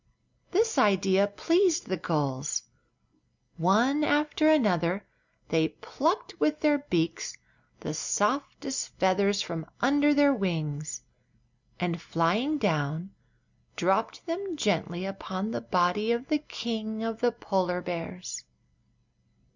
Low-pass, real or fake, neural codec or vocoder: 7.2 kHz; real; none